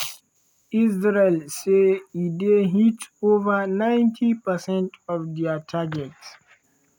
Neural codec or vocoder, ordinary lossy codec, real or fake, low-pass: none; none; real; 19.8 kHz